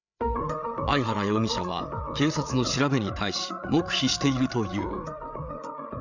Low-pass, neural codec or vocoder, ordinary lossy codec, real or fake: 7.2 kHz; codec, 16 kHz, 8 kbps, FreqCodec, larger model; none; fake